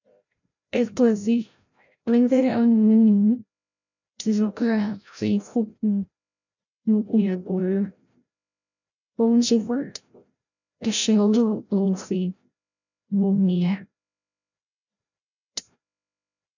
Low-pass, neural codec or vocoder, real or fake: 7.2 kHz; codec, 16 kHz, 0.5 kbps, FreqCodec, larger model; fake